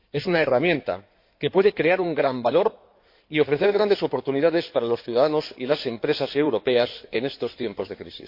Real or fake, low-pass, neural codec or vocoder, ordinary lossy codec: fake; 5.4 kHz; codec, 16 kHz in and 24 kHz out, 2.2 kbps, FireRedTTS-2 codec; MP3, 32 kbps